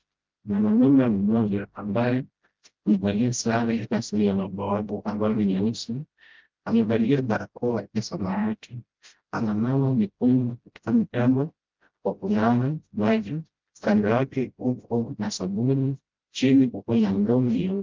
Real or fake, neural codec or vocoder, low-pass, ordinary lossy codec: fake; codec, 16 kHz, 0.5 kbps, FreqCodec, smaller model; 7.2 kHz; Opus, 24 kbps